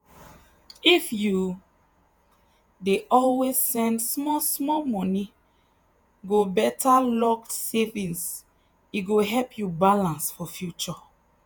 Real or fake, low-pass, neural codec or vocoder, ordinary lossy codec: fake; none; vocoder, 48 kHz, 128 mel bands, Vocos; none